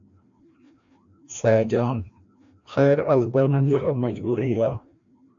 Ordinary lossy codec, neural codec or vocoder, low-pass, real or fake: AAC, 64 kbps; codec, 16 kHz, 1 kbps, FreqCodec, larger model; 7.2 kHz; fake